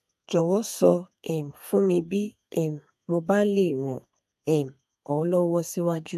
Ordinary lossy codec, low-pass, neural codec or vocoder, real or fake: AAC, 96 kbps; 14.4 kHz; codec, 32 kHz, 1.9 kbps, SNAC; fake